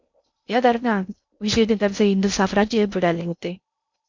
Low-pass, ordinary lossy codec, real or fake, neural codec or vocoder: 7.2 kHz; MP3, 64 kbps; fake; codec, 16 kHz in and 24 kHz out, 0.6 kbps, FocalCodec, streaming, 2048 codes